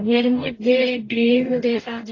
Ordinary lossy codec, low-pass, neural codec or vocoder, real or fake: AAC, 32 kbps; 7.2 kHz; codec, 44.1 kHz, 0.9 kbps, DAC; fake